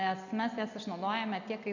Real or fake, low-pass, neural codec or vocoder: real; 7.2 kHz; none